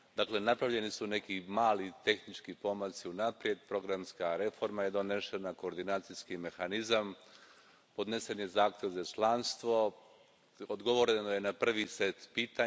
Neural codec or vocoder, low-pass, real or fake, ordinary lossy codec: none; none; real; none